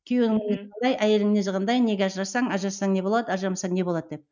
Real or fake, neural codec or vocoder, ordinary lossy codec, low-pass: real; none; none; 7.2 kHz